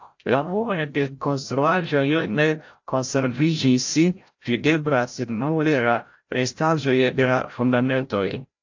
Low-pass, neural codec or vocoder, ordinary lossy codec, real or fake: 7.2 kHz; codec, 16 kHz, 0.5 kbps, FreqCodec, larger model; AAC, 48 kbps; fake